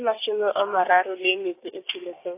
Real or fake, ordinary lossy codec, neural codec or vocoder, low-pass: fake; AAC, 24 kbps; codec, 16 kHz, 6 kbps, DAC; 3.6 kHz